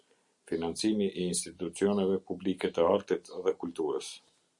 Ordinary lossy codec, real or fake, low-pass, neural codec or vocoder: Opus, 64 kbps; real; 10.8 kHz; none